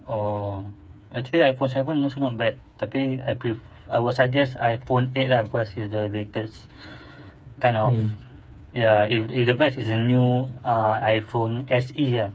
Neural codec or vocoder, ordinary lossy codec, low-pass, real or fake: codec, 16 kHz, 4 kbps, FreqCodec, smaller model; none; none; fake